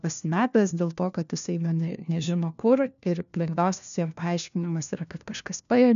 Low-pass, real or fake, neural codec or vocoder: 7.2 kHz; fake; codec, 16 kHz, 1 kbps, FunCodec, trained on LibriTTS, 50 frames a second